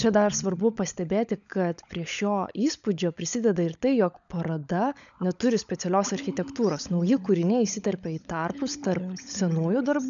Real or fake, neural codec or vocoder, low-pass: fake; codec, 16 kHz, 16 kbps, FunCodec, trained on LibriTTS, 50 frames a second; 7.2 kHz